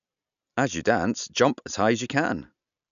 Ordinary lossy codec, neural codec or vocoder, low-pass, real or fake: MP3, 96 kbps; none; 7.2 kHz; real